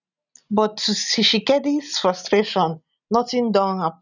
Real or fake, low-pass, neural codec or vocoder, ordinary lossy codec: real; 7.2 kHz; none; none